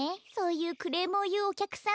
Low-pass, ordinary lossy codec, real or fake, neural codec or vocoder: none; none; real; none